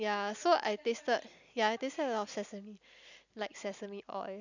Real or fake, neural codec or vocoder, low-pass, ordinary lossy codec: real; none; 7.2 kHz; none